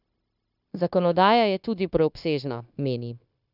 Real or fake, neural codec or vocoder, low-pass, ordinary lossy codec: fake; codec, 16 kHz, 0.9 kbps, LongCat-Audio-Codec; 5.4 kHz; none